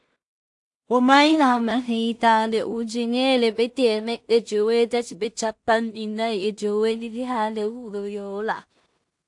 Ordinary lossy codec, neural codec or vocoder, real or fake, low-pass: AAC, 64 kbps; codec, 16 kHz in and 24 kHz out, 0.4 kbps, LongCat-Audio-Codec, two codebook decoder; fake; 10.8 kHz